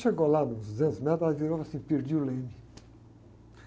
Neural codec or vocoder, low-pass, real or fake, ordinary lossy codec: none; none; real; none